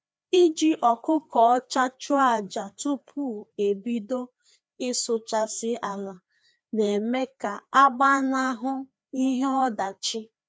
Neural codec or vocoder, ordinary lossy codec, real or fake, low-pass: codec, 16 kHz, 2 kbps, FreqCodec, larger model; none; fake; none